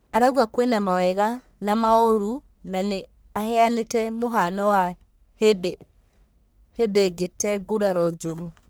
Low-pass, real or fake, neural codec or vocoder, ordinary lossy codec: none; fake; codec, 44.1 kHz, 1.7 kbps, Pupu-Codec; none